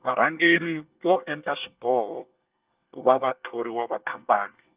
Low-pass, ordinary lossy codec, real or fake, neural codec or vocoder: 3.6 kHz; Opus, 32 kbps; fake; codec, 24 kHz, 1 kbps, SNAC